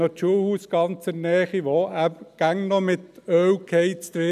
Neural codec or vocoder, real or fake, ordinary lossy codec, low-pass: none; real; none; 14.4 kHz